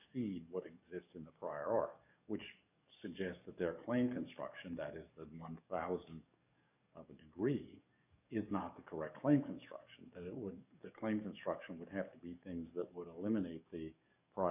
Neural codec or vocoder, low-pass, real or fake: codec, 44.1 kHz, 7.8 kbps, DAC; 3.6 kHz; fake